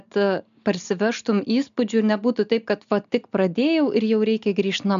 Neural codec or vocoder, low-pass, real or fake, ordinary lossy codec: none; 7.2 kHz; real; AAC, 96 kbps